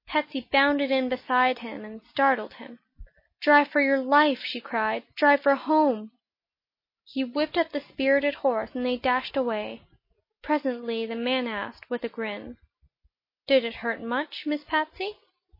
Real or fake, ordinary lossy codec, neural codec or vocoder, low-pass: real; MP3, 24 kbps; none; 5.4 kHz